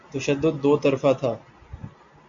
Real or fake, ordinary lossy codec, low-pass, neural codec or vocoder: real; MP3, 48 kbps; 7.2 kHz; none